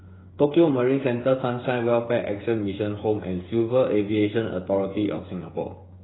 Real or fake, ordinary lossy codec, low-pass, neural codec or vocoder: fake; AAC, 16 kbps; 7.2 kHz; codec, 16 kHz, 8 kbps, FreqCodec, smaller model